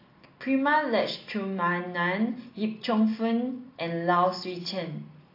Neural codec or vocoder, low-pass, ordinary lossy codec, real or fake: none; 5.4 kHz; AAC, 32 kbps; real